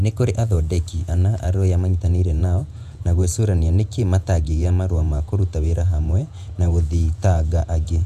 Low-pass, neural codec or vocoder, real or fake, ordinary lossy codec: 14.4 kHz; vocoder, 48 kHz, 128 mel bands, Vocos; fake; none